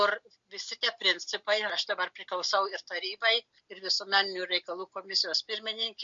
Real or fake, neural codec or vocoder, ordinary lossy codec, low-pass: real; none; MP3, 48 kbps; 7.2 kHz